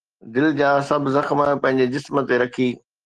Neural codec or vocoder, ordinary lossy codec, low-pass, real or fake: none; Opus, 16 kbps; 10.8 kHz; real